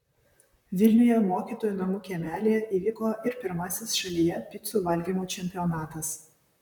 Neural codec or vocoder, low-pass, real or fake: vocoder, 44.1 kHz, 128 mel bands, Pupu-Vocoder; 19.8 kHz; fake